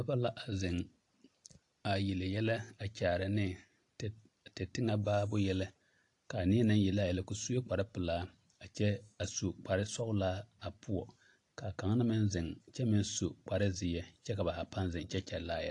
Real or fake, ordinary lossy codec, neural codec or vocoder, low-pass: real; AAC, 48 kbps; none; 10.8 kHz